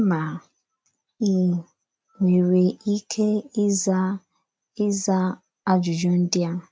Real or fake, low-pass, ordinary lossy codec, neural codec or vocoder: real; none; none; none